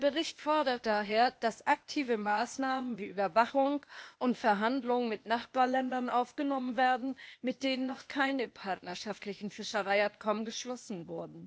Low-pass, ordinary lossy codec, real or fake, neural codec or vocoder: none; none; fake; codec, 16 kHz, 0.8 kbps, ZipCodec